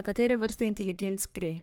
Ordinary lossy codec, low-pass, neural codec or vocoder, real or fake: none; none; codec, 44.1 kHz, 1.7 kbps, Pupu-Codec; fake